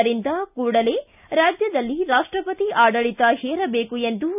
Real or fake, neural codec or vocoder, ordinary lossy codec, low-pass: real; none; none; 3.6 kHz